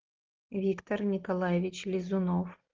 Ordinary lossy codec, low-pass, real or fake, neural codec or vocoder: Opus, 16 kbps; 7.2 kHz; real; none